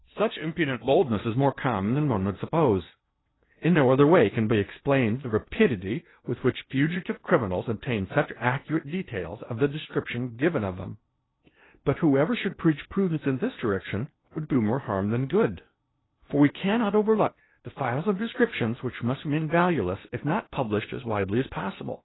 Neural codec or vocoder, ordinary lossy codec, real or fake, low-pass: codec, 16 kHz in and 24 kHz out, 0.8 kbps, FocalCodec, streaming, 65536 codes; AAC, 16 kbps; fake; 7.2 kHz